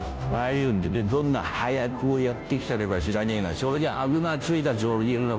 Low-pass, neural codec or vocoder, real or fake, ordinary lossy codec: none; codec, 16 kHz, 0.5 kbps, FunCodec, trained on Chinese and English, 25 frames a second; fake; none